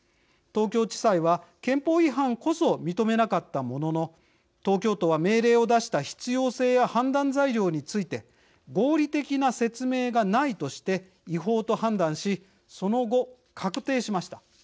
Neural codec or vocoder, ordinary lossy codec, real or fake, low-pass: none; none; real; none